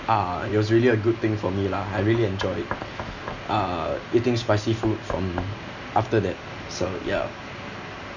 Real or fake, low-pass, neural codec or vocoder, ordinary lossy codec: real; 7.2 kHz; none; none